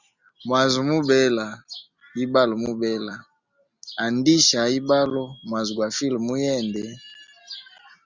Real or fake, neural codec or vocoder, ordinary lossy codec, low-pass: real; none; Opus, 64 kbps; 7.2 kHz